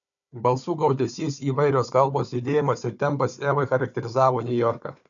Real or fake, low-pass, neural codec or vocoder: fake; 7.2 kHz; codec, 16 kHz, 4 kbps, FunCodec, trained on Chinese and English, 50 frames a second